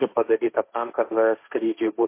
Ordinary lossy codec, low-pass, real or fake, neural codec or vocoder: MP3, 24 kbps; 3.6 kHz; fake; codec, 16 kHz, 1.1 kbps, Voila-Tokenizer